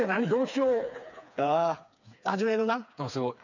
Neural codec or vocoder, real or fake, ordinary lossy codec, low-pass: codec, 16 kHz, 4 kbps, FreqCodec, smaller model; fake; none; 7.2 kHz